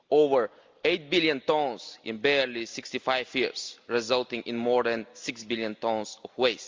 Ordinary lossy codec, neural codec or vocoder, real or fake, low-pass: Opus, 32 kbps; none; real; 7.2 kHz